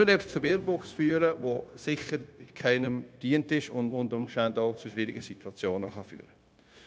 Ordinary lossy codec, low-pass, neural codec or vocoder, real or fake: none; none; codec, 16 kHz, 0.9 kbps, LongCat-Audio-Codec; fake